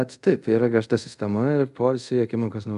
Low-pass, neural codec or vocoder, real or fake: 10.8 kHz; codec, 24 kHz, 0.5 kbps, DualCodec; fake